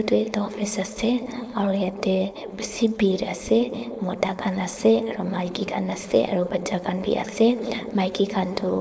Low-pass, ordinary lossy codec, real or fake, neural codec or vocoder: none; none; fake; codec, 16 kHz, 4.8 kbps, FACodec